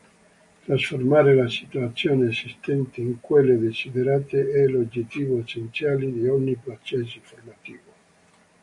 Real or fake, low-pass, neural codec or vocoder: real; 10.8 kHz; none